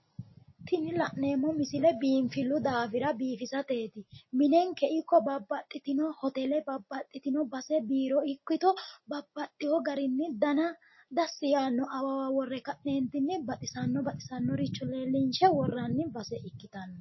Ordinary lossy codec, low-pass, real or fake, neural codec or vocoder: MP3, 24 kbps; 7.2 kHz; real; none